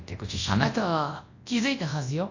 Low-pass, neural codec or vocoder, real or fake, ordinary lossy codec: 7.2 kHz; codec, 24 kHz, 0.9 kbps, WavTokenizer, large speech release; fake; AAC, 48 kbps